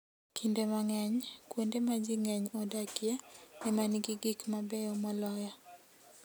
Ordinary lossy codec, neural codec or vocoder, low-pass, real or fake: none; none; none; real